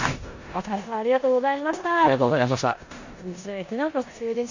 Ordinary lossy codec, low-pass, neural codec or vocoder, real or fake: Opus, 64 kbps; 7.2 kHz; codec, 16 kHz in and 24 kHz out, 0.9 kbps, LongCat-Audio-Codec, four codebook decoder; fake